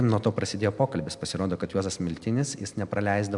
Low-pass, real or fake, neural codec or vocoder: 10.8 kHz; real; none